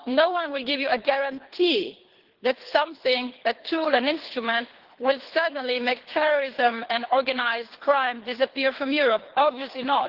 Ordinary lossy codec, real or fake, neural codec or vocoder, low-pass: Opus, 16 kbps; fake; codec, 24 kHz, 3 kbps, HILCodec; 5.4 kHz